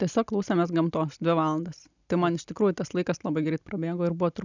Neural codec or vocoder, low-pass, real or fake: none; 7.2 kHz; real